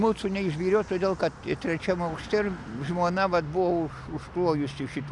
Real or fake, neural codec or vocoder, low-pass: real; none; 10.8 kHz